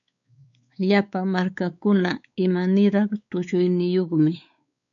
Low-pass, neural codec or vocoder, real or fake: 7.2 kHz; codec, 16 kHz, 4 kbps, X-Codec, WavLM features, trained on Multilingual LibriSpeech; fake